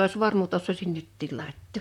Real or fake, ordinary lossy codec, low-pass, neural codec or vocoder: fake; MP3, 96 kbps; 19.8 kHz; vocoder, 44.1 kHz, 128 mel bands, Pupu-Vocoder